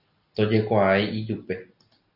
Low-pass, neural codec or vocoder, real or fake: 5.4 kHz; none; real